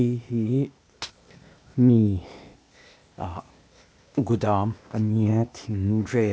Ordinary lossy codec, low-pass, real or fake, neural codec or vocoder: none; none; fake; codec, 16 kHz, 0.8 kbps, ZipCodec